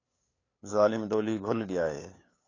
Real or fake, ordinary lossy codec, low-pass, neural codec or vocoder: fake; AAC, 32 kbps; 7.2 kHz; codec, 16 kHz, 8 kbps, FunCodec, trained on LibriTTS, 25 frames a second